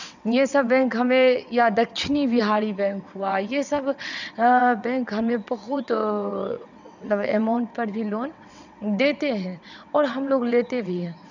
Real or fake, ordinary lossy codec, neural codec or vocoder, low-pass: fake; none; vocoder, 22.05 kHz, 80 mel bands, WaveNeXt; 7.2 kHz